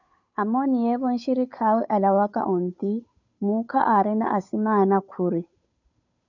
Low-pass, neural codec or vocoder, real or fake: 7.2 kHz; codec, 16 kHz, 8 kbps, FunCodec, trained on Chinese and English, 25 frames a second; fake